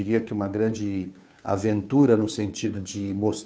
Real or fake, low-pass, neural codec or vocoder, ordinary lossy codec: fake; none; codec, 16 kHz, 2 kbps, FunCodec, trained on Chinese and English, 25 frames a second; none